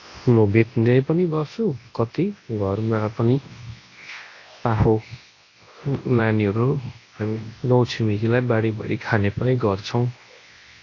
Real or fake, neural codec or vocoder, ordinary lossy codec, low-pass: fake; codec, 24 kHz, 0.9 kbps, WavTokenizer, large speech release; AAC, 32 kbps; 7.2 kHz